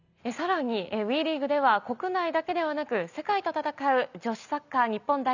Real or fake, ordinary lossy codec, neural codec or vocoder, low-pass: real; none; none; 7.2 kHz